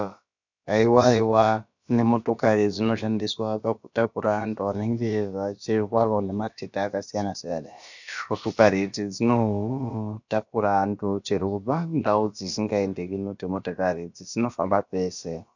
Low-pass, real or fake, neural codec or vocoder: 7.2 kHz; fake; codec, 16 kHz, about 1 kbps, DyCAST, with the encoder's durations